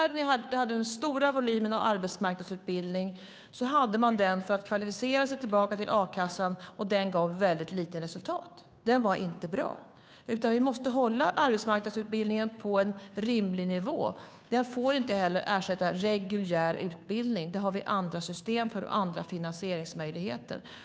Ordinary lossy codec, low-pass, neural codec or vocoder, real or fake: none; none; codec, 16 kHz, 2 kbps, FunCodec, trained on Chinese and English, 25 frames a second; fake